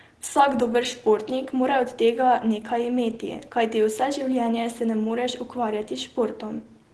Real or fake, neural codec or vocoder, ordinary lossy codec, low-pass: real; none; Opus, 16 kbps; 10.8 kHz